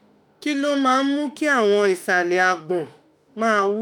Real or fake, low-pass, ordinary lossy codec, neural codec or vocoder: fake; none; none; autoencoder, 48 kHz, 32 numbers a frame, DAC-VAE, trained on Japanese speech